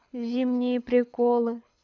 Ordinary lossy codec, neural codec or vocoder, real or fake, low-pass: MP3, 64 kbps; codec, 24 kHz, 6 kbps, HILCodec; fake; 7.2 kHz